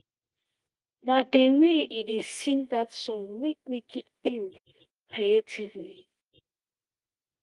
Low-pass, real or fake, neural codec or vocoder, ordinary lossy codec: 10.8 kHz; fake; codec, 24 kHz, 0.9 kbps, WavTokenizer, medium music audio release; Opus, 24 kbps